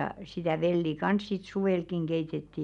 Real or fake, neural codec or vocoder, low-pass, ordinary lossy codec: real; none; 10.8 kHz; none